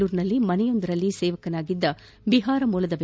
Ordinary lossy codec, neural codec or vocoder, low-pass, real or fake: none; none; none; real